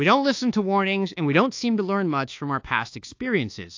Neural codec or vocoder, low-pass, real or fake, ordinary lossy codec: codec, 24 kHz, 1.2 kbps, DualCodec; 7.2 kHz; fake; AAC, 48 kbps